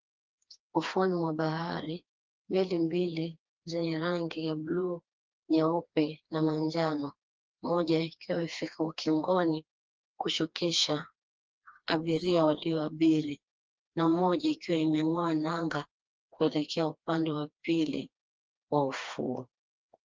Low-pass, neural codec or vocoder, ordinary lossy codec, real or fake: 7.2 kHz; codec, 16 kHz, 2 kbps, FreqCodec, smaller model; Opus, 24 kbps; fake